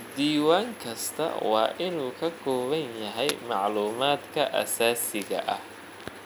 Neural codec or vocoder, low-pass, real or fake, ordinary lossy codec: none; none; real; none